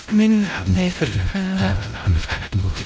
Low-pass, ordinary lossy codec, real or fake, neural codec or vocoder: none; none; fake; codec, 16 kHz, 0.5 kbps, X-Codec, WavLM features, trained on Multilingual LibriSpeech